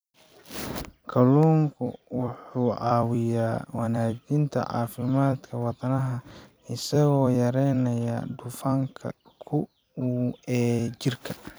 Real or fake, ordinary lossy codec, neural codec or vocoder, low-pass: fake; none; vocoder, 44.1 kHz, 128 mel bands every 256 samples, BigVGAN v2; none